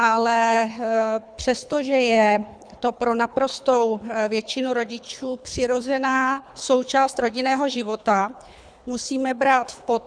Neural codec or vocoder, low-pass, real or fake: codec, 24 kHz, 3 kbps, HILCodec; 9.9 kHz; fake